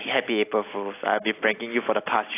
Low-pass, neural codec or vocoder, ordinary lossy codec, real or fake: 3.6 kHz; none; AAC, 24 kbps; real